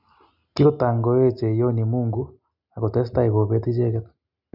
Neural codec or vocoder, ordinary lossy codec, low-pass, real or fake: none; none; 5.4 kHz; real